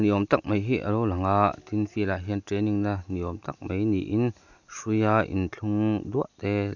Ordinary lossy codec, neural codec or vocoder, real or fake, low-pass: none; none; real; 7.2 kHz